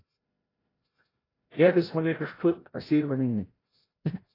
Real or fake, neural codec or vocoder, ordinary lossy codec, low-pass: fake; codec, 16 kHz, 0.5 kbps, FreqCodec, larger model; AAC, 24 kbps; 5.4 kHz